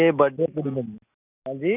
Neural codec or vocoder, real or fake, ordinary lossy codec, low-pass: none; real; none; 3.6 kHz